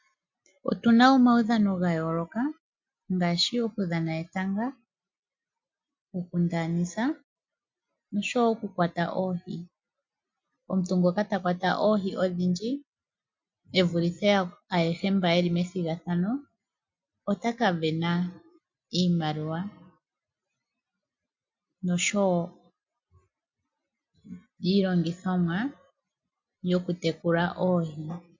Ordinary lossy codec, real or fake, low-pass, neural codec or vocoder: MP3, 48 kbps; real; 7.2 kHz; none